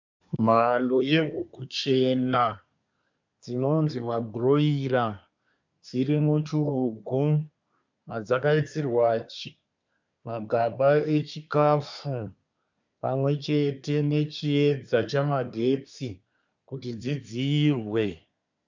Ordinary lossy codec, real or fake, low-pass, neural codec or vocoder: MP3, 64 kbps; fake; 7.2 kHz; codec, 24 kHz, 1 kbps, SNAC